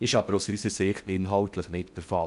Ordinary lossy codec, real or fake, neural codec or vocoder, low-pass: none; fake; codec, 16 kHz in and 24 kHz out, 0.6 kbps, FocalCodec, streaming, 4096 codes; 10.8 kHz